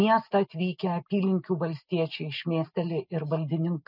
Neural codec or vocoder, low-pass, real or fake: none; 5.4 kHz; real